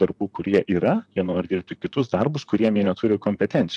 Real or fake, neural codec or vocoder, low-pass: fake; vocoder, 44.1 kHz, 128 mel bands, Pupu-Vocoder; 10.8 kHz